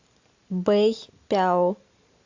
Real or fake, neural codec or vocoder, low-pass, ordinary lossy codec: real; none; 7.2 kHz; AAC, 48 kbps